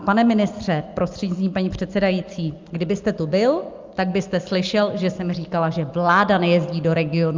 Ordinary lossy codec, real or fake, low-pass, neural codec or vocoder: Opus, 24 kbps; real; 7.2 kHz; none